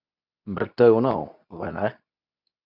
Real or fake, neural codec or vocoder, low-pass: fake; codec, 24 kHz, 0.9 kbps, WavTokenizer, medium speech release version 2; 5.4 kHz